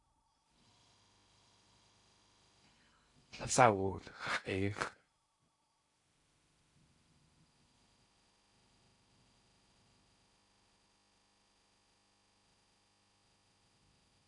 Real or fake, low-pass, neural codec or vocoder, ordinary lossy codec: fake; 10.8 kHz; codec, 16 kHz in and 24 kHz out, 0.6 kbps, FocalCodec, streaming, 2048 codes; AAC, 48 kbps